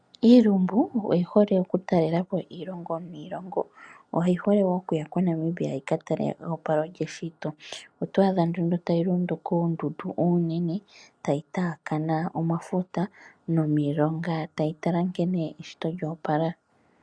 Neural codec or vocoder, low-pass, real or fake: none; 9.9 kHz; real